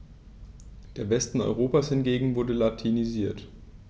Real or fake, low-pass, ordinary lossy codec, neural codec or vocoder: real; none; none; none